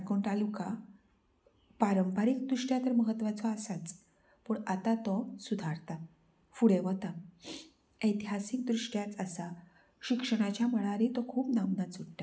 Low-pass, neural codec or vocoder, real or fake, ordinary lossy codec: none; none; real; none